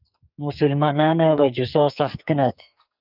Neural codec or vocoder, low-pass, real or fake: codec, 44.1 kHz, 2.6 kbps, SNAC; 5.4 kHz; fake